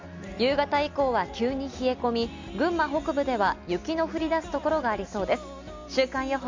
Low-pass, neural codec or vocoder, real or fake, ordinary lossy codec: 7.2 kHz; none; real; MP3, 64 kbps